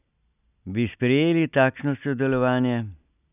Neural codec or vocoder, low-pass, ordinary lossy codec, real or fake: none; 3.6 kHz; none; real